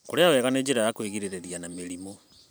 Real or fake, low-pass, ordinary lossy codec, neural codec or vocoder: fake; none; none; vocoder, 44.1 kHz, 128 mel bands every 512 samples, BigVGAN v2